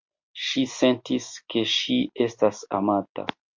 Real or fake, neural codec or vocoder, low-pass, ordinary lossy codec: real; none; 7.2 kHz; MP3, 64 kbps